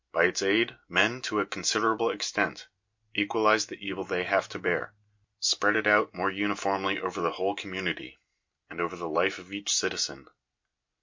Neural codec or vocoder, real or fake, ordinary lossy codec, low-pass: none; real; MP3, 48 kbps; 7.2 kHz